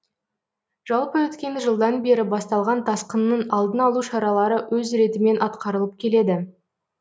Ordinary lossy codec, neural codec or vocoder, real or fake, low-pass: none; none; real; none